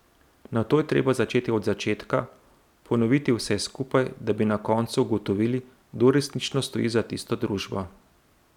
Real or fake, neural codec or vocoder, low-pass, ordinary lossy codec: real; none; 19.8 kHz; none